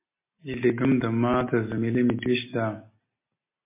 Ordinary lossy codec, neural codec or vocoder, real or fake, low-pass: MP3, 24 kbps; none; real; 3.6 kHz